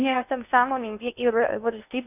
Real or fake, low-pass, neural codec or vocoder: fake; 3.6 kHz; codec, 16 kHz in and 24 kHz out, 0.6 kbps, FocalCodec, streaming, 2048 codes